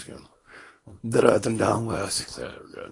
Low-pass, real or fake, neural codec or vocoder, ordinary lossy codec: 10.8 kHz; fake; codec, 24 kHz, 0.9 kbps, WavTokenizer, small release; AAC, 32 kbps